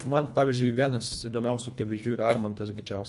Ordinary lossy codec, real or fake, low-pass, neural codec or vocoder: MP3, 64 kbps; fake; 10.8 kHz; codec, 24 kHz, 1.5 kbps, HILCodec